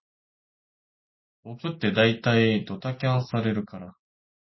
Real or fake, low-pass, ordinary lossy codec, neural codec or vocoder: real; 7.2 kHz; MP3, 24 kbps; none